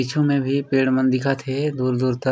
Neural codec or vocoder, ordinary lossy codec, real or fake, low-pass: none; none; real; none